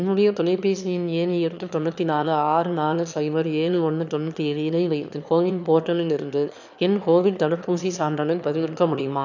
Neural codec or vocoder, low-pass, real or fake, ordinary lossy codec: autoencoder, 22.05 kHz, a latent of 192 numbers a frame, VITS, trained on one speaker; 7.2 kHz; fake; none